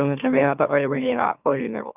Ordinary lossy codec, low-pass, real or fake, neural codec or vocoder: none; 3.6 kHz; fake; autoencoder, 44.1 kHz, a latent of 192 numbers a frame, MeloTTS